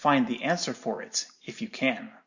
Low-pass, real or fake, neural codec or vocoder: 7.2 kHz; real; none